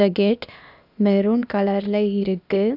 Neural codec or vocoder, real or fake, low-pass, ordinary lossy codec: codec, 16 kHz, 0.8 kbps, ZipCodec; fake; 5.4 kHz; none